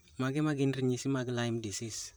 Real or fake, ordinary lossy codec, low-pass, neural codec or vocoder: fake; none; none; vocoder, 44.1 kHz, 128 mel bands, Pupu-Vocoder